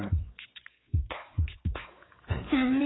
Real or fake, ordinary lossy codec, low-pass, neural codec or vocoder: fake; AAC, 16 kbps; 7.2 kHz; codec, 16 kHz, 4 kbps, X-Codec, WavLM features, trained on Multilingual LibriSpeech